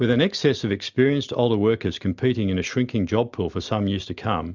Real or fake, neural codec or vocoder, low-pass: real; none; 7.2 kHz